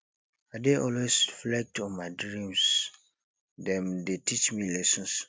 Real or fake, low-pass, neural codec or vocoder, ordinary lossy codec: real; none; none; none